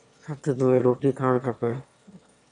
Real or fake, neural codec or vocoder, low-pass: fake; autoencoder, 22.05 kHz, a latent of 192 numbers a frame, VITS, trained on one speaker; 9.9 kHz